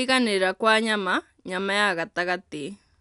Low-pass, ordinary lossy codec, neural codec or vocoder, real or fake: 10.8 kHz; none; none; real